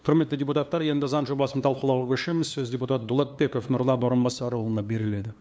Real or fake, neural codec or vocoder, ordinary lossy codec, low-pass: fake; codec, 16 kHz, 2 kbps, FunCodec, trained on LibriTTS, 25 frames a second; none; none